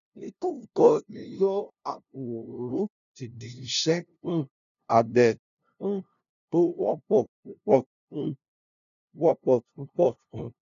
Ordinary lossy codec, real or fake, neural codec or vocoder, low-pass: none; fake; codec, 16 kHz, 0.5 kbps, FunCodec, trained on LibriTTS, 25 frames a second; 7.2 kHz